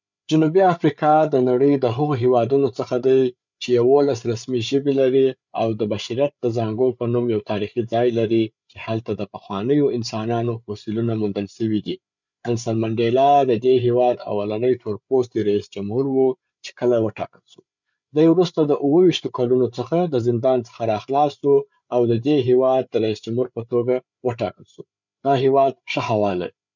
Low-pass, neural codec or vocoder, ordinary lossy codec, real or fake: 7.2 kHz; codec, 16 kHz, 8 kbps, FreqCodec, larger model; none; fake